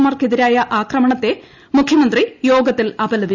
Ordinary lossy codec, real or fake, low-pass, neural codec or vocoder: none; real; 7.2 kHz; none